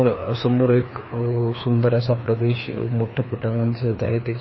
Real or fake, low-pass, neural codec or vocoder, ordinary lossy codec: fake; 7.2 kHz; codec, 16 kHz, 2 kbps, FreqCodec, larger model; MP3, 24 kbps